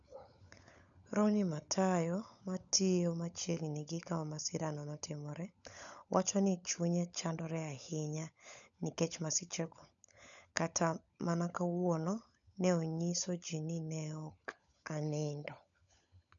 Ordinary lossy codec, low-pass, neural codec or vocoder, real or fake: none; 7.2 kHz; codec, 16 kHz, 16 kbps, FunCodec, trained on LibriTTS, 50 frames a second; fake